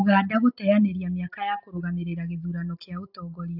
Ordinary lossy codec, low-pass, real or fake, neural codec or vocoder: AAC, 48 kbps; 5.4 kHz; real; none